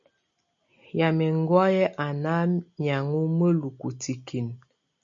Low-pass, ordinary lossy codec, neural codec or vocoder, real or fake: 7.2 kHz; MP3, 64 kbps; none; real